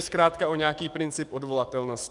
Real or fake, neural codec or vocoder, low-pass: fake; codec, 44.1 kHz, 7.8 kbps, DAC; 10.8 kHz